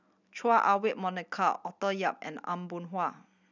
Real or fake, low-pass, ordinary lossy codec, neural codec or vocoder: real; 7.2 kHz; none; none